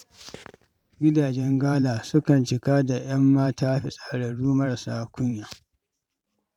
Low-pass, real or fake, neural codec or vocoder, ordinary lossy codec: 19.8 kHz; fake; vocoder, 44.1 kHz, 128 mel bands every 512 samples, BigVGAN v2; none